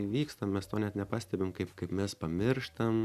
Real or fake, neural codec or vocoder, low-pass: real; none; 14.4 kHz